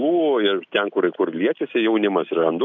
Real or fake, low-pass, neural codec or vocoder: real; 7.2 kHz; none